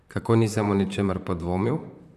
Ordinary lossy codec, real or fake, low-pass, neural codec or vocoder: none; fake; 14.4 kHz; vocoder, 44.1 kHz, 128 mel bands, Pupu-Vocoder